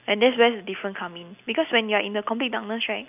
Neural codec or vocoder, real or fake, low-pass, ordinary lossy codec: none; real; 3.6 kHz; none